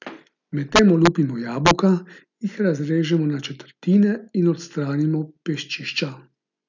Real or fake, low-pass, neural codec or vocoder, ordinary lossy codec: real; 7.2 kHz; none; none